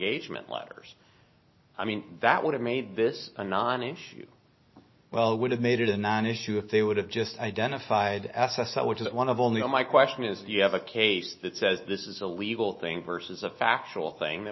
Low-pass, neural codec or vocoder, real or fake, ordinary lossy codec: 7.2 kHz; none; real; MP3, 24 kbps